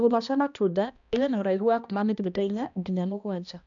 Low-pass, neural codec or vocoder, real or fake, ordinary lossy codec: 7.2 kHz; codec, 16 kHz, 1 kbps, X-Codec, HuBERT features, trained on balanced general audio; fake; none